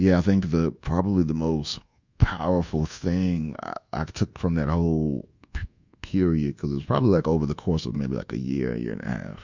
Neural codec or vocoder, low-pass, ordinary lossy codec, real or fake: codec, 24 kHz, 1.2 kbps, DualCodec; 7.2 kHz; Opus, 64 kbps; fake